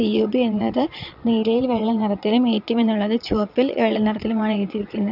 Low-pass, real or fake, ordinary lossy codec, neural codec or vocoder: 5.4 kHz; fake; none; vocoder, 22.05 kHz, 80 mel bands, Vocos